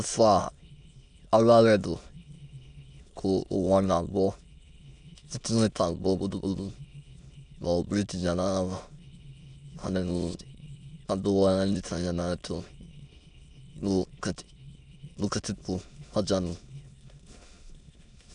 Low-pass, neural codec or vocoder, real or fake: 9.9 kHz; autoencoder, 22.05 kHz, a latent of 192 numbers a frame, VITS, trained on many speakers; fake